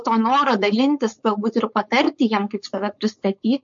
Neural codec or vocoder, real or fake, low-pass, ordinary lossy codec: codec, 16 kHz, 4.8 kbps, FACodec; fake; 7.2 kHz; MP3, 48 kbps